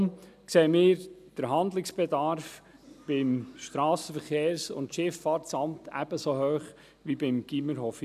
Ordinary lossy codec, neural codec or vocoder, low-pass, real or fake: none; none; 14.4 kHz; real